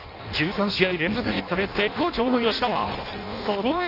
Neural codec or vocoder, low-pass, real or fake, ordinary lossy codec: codec, 16 kHz in and 24 kHz out, 0.6 kbps, FireRedTTS-2 codec; 5.4 kHz; fake; none